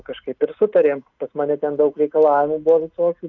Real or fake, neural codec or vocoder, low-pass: real; none; 7.2 kHz